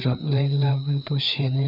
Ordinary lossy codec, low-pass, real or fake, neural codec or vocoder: none; 5.4 kHz; fake; codec, 16 kHz, 4 kbps, FreqCodec, larger model